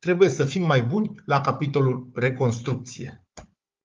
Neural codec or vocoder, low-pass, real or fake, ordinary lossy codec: codec, 16 kHz, 4 kbps, FunCodec, trained on Chinese and English, 50 frames a second; 7.2 kHz; fake; Opus, 32 kbps